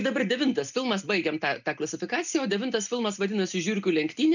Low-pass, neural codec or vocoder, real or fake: 7.2 kHz; none; real